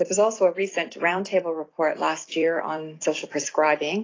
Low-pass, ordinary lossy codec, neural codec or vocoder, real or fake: 7.2 kHz; AAC, 32 kbps; vocoder, 44.1 kHz, 128 mel bands every 256 samples, BigVGAN v2; fake